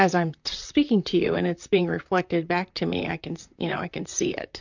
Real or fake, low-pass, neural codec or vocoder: fake; 7.2 kHz; vocoder, 44.1 kHz, 128 mel bands, Pupu-Vocoder